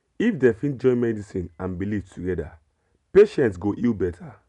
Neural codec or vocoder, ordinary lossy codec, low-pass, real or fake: none; none; 10.8 kHz; real